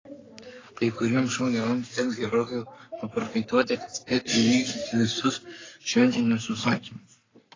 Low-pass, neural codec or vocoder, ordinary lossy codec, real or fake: 7.2 kHz; codec, 44.1 kHz, 3.4 kbps, Pupu-Codec; AAC, 32 kbps; fake